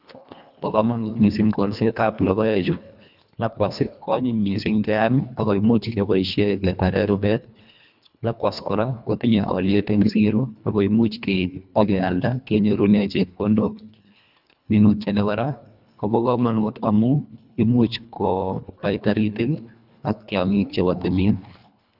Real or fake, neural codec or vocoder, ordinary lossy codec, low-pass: fake; codec, 24 kHz, 1.5 kbps, HILCodec; none; 5.4 kHz